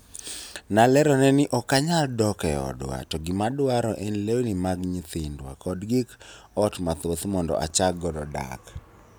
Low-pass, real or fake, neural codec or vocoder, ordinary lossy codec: none; real; none; none